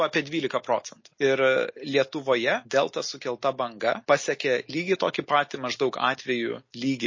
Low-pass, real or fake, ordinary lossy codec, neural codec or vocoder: 7.2 kHz; real; MP3, 32 kbps; none